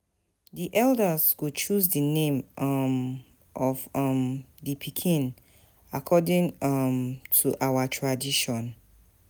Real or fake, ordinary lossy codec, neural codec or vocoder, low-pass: real; none; none; none